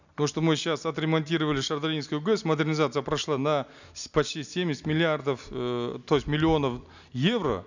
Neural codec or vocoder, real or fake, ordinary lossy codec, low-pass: none; real; none; 7.2 kHz